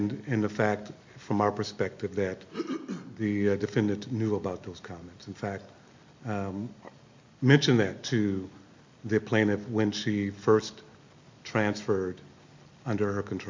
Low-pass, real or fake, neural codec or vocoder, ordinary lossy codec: 7.2 kHz; real; none; MP3, 64 kbps